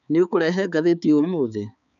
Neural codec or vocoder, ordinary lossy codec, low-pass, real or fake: codec, 16 kHz, 4 kbps, X-Codec, HuBERT features, trained on balanced general audio; none; 7.2 kHz; fake